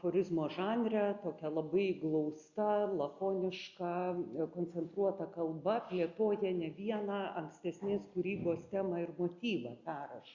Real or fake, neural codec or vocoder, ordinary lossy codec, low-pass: real; none; Opus, 64 kbps; 7.2 kHz